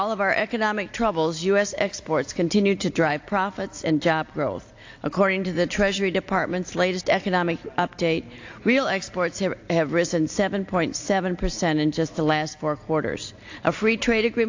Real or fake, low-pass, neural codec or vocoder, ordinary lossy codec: real; 7.2 kHz; none; AAC, 48 kbps